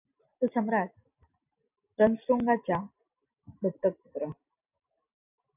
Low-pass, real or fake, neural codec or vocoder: 3.6 kHz; real; none